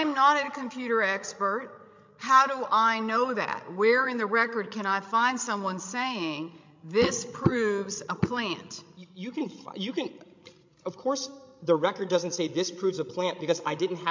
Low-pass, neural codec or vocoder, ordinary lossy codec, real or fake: 7.2 kHz; codec, 16 kHz, 16 kbps, FreqCodec, larger model; MP3, 48 kbps; fake